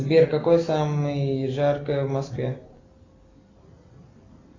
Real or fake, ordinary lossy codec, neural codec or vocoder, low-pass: real; AAC, 32 kbps; none; 7.2 kHz